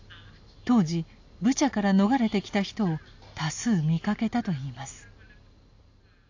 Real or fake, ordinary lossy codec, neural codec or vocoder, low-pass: real; AAC, 48 kbps; none; 7.2 kHz